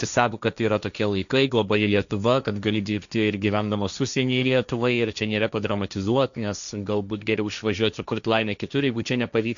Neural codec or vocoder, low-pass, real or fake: codec, 16 kHz, 1.1 kbps, Voila-Tokenizer; 7.2 kHz; fake